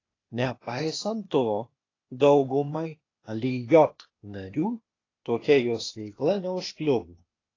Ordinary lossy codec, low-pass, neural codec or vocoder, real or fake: AAC, 32 kbps; 7.2 kHz; codec, 16 kHz, 0.8 kbps, ZipCodec; fake